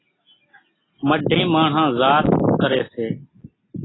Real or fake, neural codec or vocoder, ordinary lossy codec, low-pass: real; none; AAC, 16 kbps; 7.2 kHz